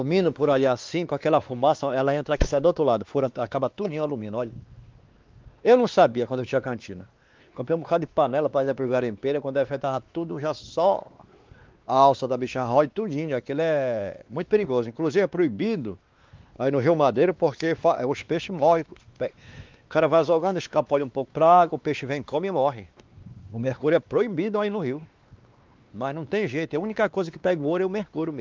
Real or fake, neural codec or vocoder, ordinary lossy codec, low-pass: fake; codec, 16 kHz, 2 kbps, X-Codec, WavLM features, trained on Multilingual LibriSpeech; Opus, 32 kbps; 7.2 kHz